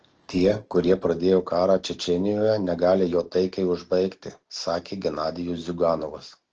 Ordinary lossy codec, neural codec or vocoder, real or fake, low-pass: Opus, 16 kbps; none; real; 7.2 kHz